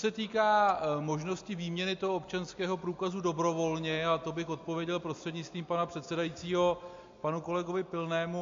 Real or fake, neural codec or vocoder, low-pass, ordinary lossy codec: real; none; 7.2 kHz; MP3, 48 kbps